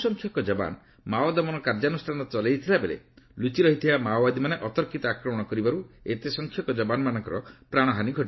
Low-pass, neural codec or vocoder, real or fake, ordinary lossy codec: 7.2 kHz; none; real; MP3, 24 kbps